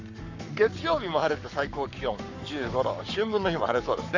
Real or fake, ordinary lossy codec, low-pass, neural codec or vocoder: fake; none; 7.2 kHz; codec, 44.1 kHz, 7.8 kbps, Pupu-Codec